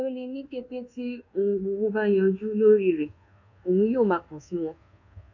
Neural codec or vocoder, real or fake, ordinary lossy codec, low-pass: codec, 24 kHz, 1.2 kbps, DualCodec; fake; none; 7.2 kHz